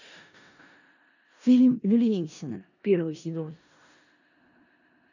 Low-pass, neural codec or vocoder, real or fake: 7.2 kHz; codec, 16 kHz in and 24 kHz out, 0.4 kbps, LongCat-Audio-Codec, four codebook decoder; fake